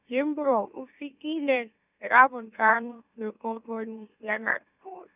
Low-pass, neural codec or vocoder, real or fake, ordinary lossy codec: 3.6 kHz; autoencoder, 44.1 kHz, a latent of 192 numbers a frame, MeloTTS; fake; none